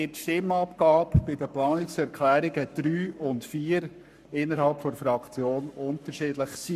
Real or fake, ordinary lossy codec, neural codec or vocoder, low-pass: fake; none; codec, 44.1 kHz, 7.8 kbps, Pupu-Codec; 14.4 kHz